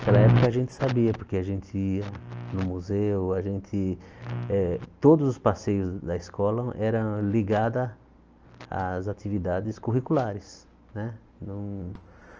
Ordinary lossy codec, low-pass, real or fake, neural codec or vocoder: Opus, 24 kbps; 7.2 kHz; real; none